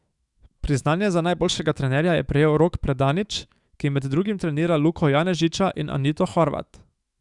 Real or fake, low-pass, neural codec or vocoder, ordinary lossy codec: fake; 10.8 kHz; autoencoder, 48 kHz, 128 numbers a frame, DAC-VAE, trained on Japanese speech; Opus, 64 kbps